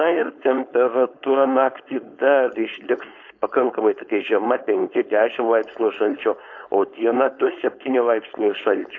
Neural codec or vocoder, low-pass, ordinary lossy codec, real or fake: codec, 16 kHz, 4.8 kbps, FACodec; 7.2 kHz; AAC, 48 kbps; fake